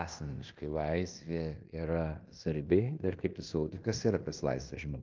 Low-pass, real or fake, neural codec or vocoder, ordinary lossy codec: 7.2 kHz; fake; codec, 16 kHz in and 24 kHz out, 0.9 kbps, LongCat-Audio-Codec, fine tuned four codebook decoder; Opus, 24 kbps